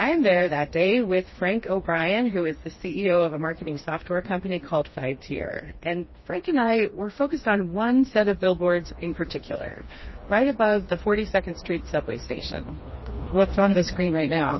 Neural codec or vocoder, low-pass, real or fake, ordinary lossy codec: codec, 16 kHz, 2 kbps, FreqCodec, smaller model; 7.2 kHz; fake; MP3, 24 kbps